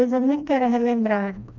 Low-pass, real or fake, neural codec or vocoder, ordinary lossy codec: 7.2 kHz; fake; codec, 16 kHz, 1 kbps, FreqCodec, smaller model; none